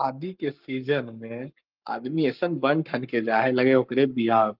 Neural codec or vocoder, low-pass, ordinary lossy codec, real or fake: codec, 44.1 kHz, 7.8 kbps, Pupu-Codec; 5.4 kHz; Opus, 32 kbps; fake